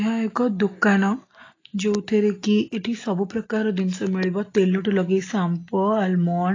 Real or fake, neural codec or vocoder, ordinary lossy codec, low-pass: real; none; AAC, 32 kbps; 7.2 kHz